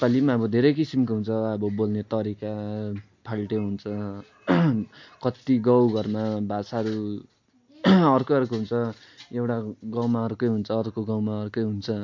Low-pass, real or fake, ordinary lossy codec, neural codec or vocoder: 7.2 kHz; real; MP3, 48 kbps; none